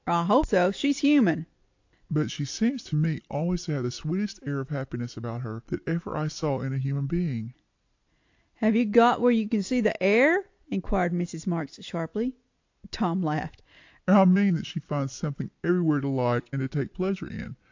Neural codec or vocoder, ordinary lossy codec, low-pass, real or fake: none; AAC, 48 kbps; 7.2 kHz; real